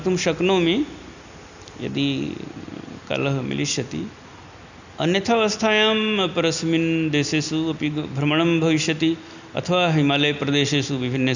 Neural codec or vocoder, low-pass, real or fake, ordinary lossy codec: none; 7.2 kHz; real; none